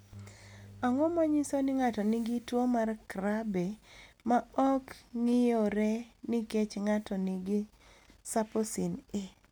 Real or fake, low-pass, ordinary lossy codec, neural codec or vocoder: real; none; none; none